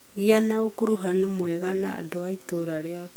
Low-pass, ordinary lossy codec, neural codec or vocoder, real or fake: none; none; codec, 44.1 kHz, 2.6 kbps, SNAC; fake